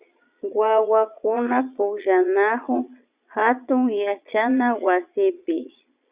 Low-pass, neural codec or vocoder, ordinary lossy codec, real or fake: 3.6 kHz; vocoder, 22.05 kHz, 80 mel bands, Vocos; Opus, 64 kbps; fake